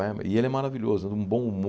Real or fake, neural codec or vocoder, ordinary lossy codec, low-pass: real; none; none; none